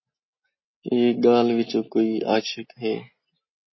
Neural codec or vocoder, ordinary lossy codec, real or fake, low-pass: none; MP3, 24 kbps; real; 7.2 kHz